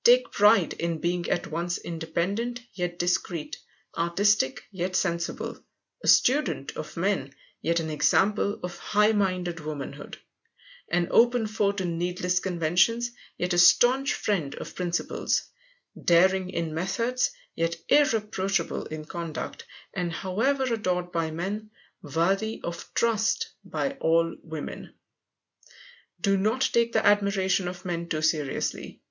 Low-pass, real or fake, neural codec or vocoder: 7.2 kHz; real; none